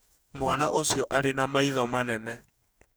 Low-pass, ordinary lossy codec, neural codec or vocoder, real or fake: none; none; codec, 44.1 kHz, 2.6 kbps, DAC; fake